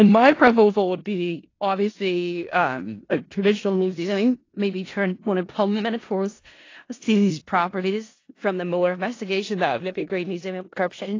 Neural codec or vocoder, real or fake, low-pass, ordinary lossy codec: codec, 16 kHz in and 24 kHz out, 0.4 kbps, LongCat-Audio-Codec, four codebook decoder; fake; 7.2 kHz; AAC, 32 kbps